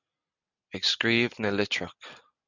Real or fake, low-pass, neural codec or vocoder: real; 7.2 kHz; none